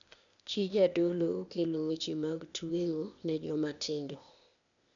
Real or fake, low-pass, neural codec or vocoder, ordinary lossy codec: fake; 7.2 kHz; codec, 16 kHz, 0.8 kbps, ZipCodec; none